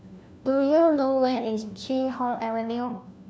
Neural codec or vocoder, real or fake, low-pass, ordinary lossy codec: codec, 16 kHz, 1 kbps, FunCodec, trained on LibriTTS, 50 frames a second; fake; none; none